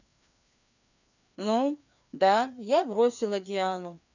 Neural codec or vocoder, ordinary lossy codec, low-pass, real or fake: codec, 16 kHz, 2 kbps, FreqCodec, larger model; none; 7.2 kHz; fake